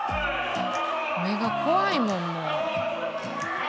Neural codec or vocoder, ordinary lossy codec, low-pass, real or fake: none; none; none; real